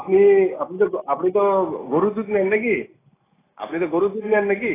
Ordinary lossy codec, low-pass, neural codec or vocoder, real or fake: AAC, 16 kbps; 3.6 kHz; none; real